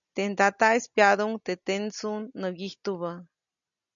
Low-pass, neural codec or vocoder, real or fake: 7.2 kHz; none; real